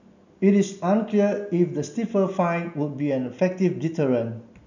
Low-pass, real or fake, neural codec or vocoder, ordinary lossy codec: 7.2 kHz; real; none; none